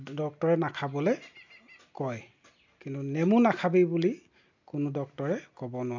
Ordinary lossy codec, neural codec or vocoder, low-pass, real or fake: MP3, 64 kbps; none; 7.2 kHz; real